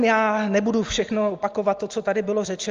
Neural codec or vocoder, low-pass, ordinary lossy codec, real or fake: none; 7.2 kHz; Opus, 32 kbps; real